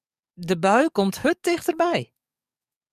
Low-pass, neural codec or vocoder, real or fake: 14.4 kHz; codec, 44.1 kHz, 7.8 kbps, DAC; fake